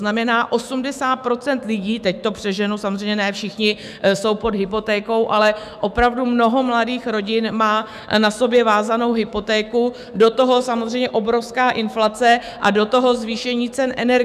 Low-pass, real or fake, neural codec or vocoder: 14.4 kHz; fake; autoencoder, 48 kHz, 128 numbers a frame, DAC-VAE, trained on Japanese speech